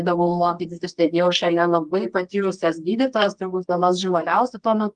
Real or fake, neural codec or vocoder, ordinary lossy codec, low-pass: fake; codec, 24 kHz, 0.9 kbps, WavTokenizer, medium music audio release; Opus, 32 kbps; 10.8 kHz